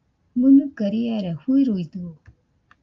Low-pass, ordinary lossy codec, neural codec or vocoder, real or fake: 7.2 kHz; Opus, 24 kbps; none; real